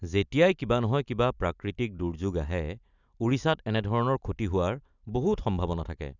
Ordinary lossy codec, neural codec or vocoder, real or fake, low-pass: none; none; real; 7.2 kHz